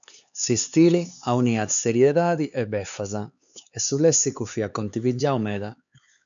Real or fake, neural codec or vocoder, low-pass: fake; codec, 16 kHz, 4 kbps, X-Codec, HuBERT features, trained on LibriSpeech; 7.2 kHz